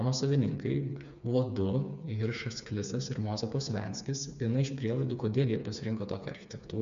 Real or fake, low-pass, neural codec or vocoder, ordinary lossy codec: fake; 7.2 kHz; codec, 16 kHz, 4 kbps, FreqCodec, smaller model; MP3, 64 kbps